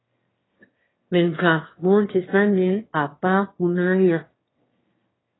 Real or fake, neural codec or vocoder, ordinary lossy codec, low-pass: fake; autoencoder, 22.05 kHz, a latent of 192 numbers a frame, VITS, trained on one speaker; AAC, 16 kbps; 7.2 kHz